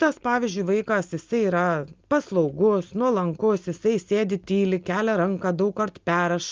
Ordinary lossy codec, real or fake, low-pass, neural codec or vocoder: Opus, 24 kbps; real; 7.2 kHz; none